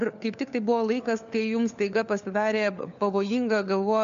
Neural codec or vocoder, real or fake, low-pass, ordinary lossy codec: codec, 16 kHz, 4 kbps, FunCodec, trained on LibriTTS, 50 frames a second; fake; 7.2 kHz; MP3, 64 kbps